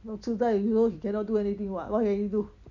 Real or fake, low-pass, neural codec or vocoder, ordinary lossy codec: real; 7.2 kHz; none; none